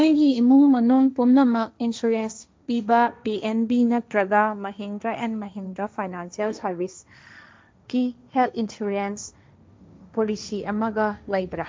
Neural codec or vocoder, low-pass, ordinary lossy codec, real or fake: codec, 16 kHz, 1.1 kbps, Voila-Tokenizer; none; none; fake